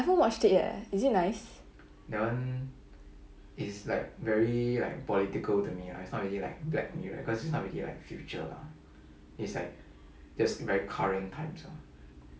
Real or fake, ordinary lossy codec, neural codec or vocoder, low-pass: real; none; none; none